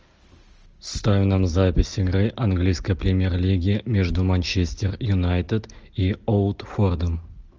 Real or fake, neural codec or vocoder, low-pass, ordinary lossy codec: real; none; 7.2 kHz; Opus, 24 kbps